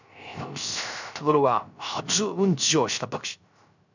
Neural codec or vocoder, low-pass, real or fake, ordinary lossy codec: codec, 16 kHz, 0.3 kbps, FocalCodec; 7.2 kHz; fake; none